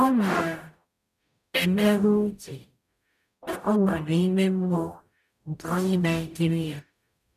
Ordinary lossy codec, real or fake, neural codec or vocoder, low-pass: none; fake; codec, 44.1 kHz, 0.9 kbps, DAC; 14.4 kHz